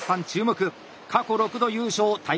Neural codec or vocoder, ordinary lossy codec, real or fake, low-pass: none; none; real; none